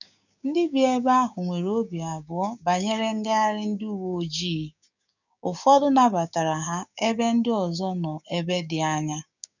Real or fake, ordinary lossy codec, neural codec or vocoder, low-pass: fake; none; codec, 16 kHz, 6 kbps, DAC; 7.2 kHz